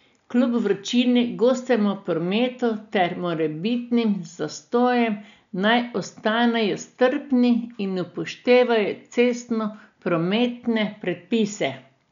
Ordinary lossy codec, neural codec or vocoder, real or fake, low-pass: none; none; real; 7.2 kHz